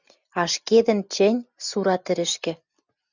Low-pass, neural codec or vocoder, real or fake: 7.2 kHz; none; real